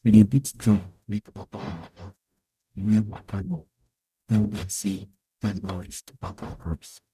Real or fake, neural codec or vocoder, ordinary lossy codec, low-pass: fake; codec, 44.1 kHz, 0.9 kbps, DAC; none; 14.4 kHz